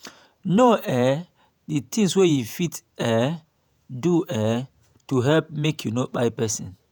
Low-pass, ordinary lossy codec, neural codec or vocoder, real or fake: none; none; vocoder, 48 kHz, 128 mel bands, Vocos; fake